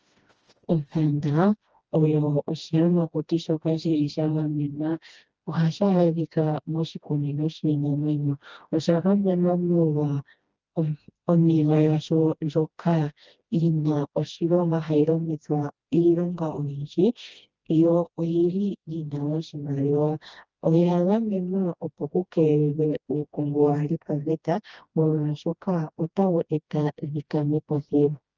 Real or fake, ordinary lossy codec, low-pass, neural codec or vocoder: fake; Opus, 24 kbps; 7.2 kHz; codec, 16 kHz, 1 kbps, FreqCodec, smaller model